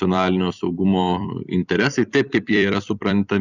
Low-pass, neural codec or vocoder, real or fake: 7.2 kHz; vocoder, 44.1 kHz, 128 mel bands every 256 samples, BigVGAN v2; fake